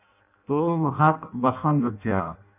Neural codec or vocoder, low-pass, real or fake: codec, 16 kHz in and 24 kHz out, 0.6 kbps, FireRedTTS-2 codec; 3.6 kHz; fake